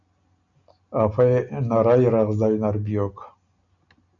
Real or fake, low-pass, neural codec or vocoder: real; 7.2 kHz; none